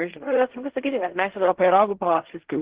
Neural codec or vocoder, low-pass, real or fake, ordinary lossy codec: codec, 16 kHz in and 24 kHz out, 0.4 kbps, LongCat-Audio-Codec, fine tuned four codebook decoder; 3.6 kHz; fake; Opus, 16 kbps